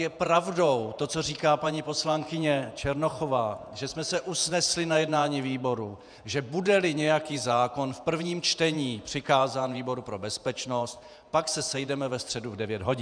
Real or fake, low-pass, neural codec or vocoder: fake; 9.9 kHz; vocoder, 48 kHz, 128 mel bands, Vocos